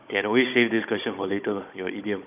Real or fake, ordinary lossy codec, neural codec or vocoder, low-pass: fake; none; codec, 16 kHz, 16 kbps, FunCodec, trained on Chinese and English, 50 frames a second; 3.6 kHz